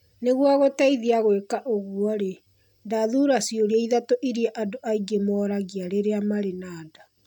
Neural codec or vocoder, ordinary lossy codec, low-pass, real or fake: none; none; 19.8 kHz; real